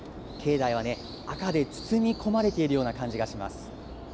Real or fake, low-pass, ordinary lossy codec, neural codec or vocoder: real; none; none; none